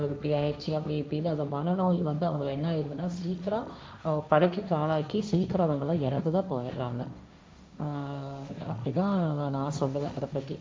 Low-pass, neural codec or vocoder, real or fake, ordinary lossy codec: none; codec, 16 kHz, 1.1 kbps, Voila-Tokenizer; fake; none